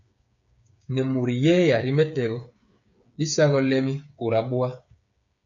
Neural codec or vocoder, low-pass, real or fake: codec, 16 kHz, 8 kbps, FreqCodec, smaller model; 7.2 kHz; fake